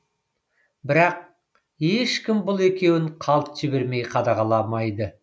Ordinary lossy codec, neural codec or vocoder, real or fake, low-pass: none; none; real; none